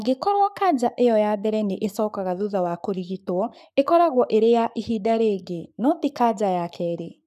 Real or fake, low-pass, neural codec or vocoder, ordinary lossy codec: fake; 14.4 kHz; codec, 44.1 kHz, 7.8 kbps, Pupu-Codec; none